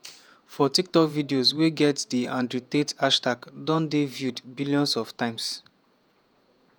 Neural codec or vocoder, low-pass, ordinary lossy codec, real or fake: vocoder, 48 kHz, 128 mel bands, Vocos; none; none; fake